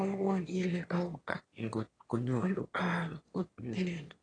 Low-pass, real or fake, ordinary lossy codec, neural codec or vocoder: 9.9 kHz; fake; AAC, 32 kbps; autoencoder, 22.05 kHz, a latent of 192 numbers a frame, VITS, trained on one speaker